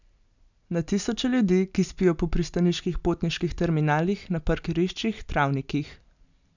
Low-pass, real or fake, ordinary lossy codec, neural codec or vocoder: 7.2 kHz; real; none; none